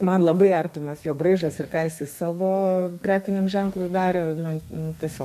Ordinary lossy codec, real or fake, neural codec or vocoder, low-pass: MP3, 64 kbps; fake; codec, 32 kHz, 1.9 kbps, SNAC; 14.4 kHz